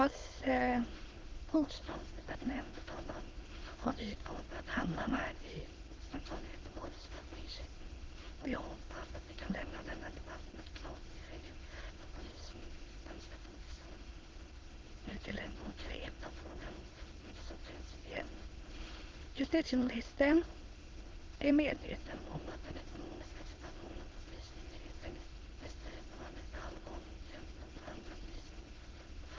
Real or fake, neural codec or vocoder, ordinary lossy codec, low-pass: fake; autoencoder, 22.05 kHz, a latent of 192 numbers a frame, VITS, trained on many speakers; Opus, 16 kbps; 7.2 kHz